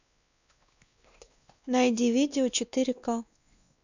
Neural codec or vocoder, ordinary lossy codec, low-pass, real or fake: codec, 16 kHz, 2 kbps, X-Codec, WavLM features, trained on Multilingual LibriSpeech; none; 7.2 kHz; fake